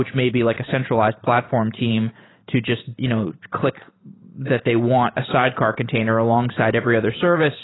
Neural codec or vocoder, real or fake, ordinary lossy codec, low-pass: vocoder, 44.1 kHz, 128 mel bands every 512 samples, BigVGAN v2; fake; AAC, 16 kbps; 7.2 kHz